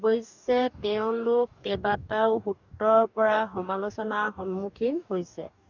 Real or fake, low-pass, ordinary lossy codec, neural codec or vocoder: fake; 7.2 kHz; none; codec, 44.1 kHz, 2.6 kbps, DAC